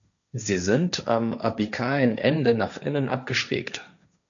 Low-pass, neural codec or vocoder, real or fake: 7.2 kHz; codec, 16 kHz, 1.1 kbps, Voila-Tokenizer; fake